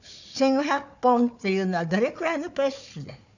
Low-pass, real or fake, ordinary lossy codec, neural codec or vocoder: 7.2 kHz; fake; none; codec, 16 kHz, 4 kbps, FunCodec, trained on Chinese and English, 50 frames a second